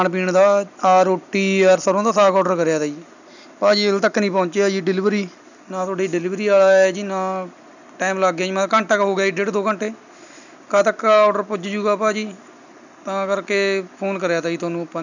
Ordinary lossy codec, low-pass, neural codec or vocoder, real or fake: none; 7.2 kHz; none; real